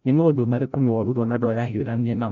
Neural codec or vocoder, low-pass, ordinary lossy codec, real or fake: codec, 16 kHz, 0.5 kbps, FreqCodec, larger model; 7.2 kHz; none; fake